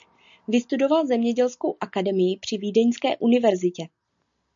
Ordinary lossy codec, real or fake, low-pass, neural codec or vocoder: MP3, 64 kbps; real; 7.2 kHz; none